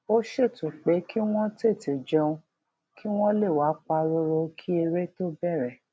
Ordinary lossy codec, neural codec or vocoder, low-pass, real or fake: none; none; none; real